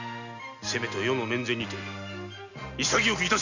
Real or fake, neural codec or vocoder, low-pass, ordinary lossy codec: real; none; 7.2 kHz; none